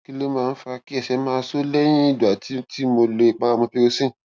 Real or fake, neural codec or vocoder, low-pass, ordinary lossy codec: real; none; none; none